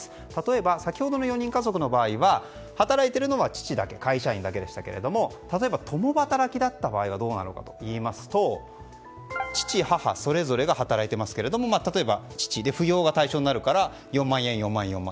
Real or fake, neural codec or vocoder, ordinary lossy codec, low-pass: real; none; none; none